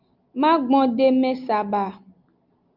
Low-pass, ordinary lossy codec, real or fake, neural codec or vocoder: 5.4 kHz; Opus, 24 kbps; real; none